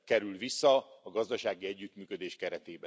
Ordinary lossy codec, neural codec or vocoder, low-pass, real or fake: none; none; none; real